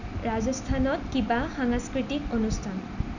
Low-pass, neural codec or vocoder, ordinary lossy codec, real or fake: 7.2 kHz; none; none; real